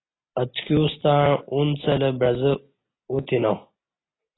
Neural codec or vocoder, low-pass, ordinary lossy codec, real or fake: none; 7.2 kHz; AAC, 16 kbps; real